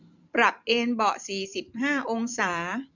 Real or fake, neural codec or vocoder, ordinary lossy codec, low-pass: fake; vocoder, 44.1 kHz, 128 mel bands every 256 samples, BigVGAN v2; none; 7.2 kHz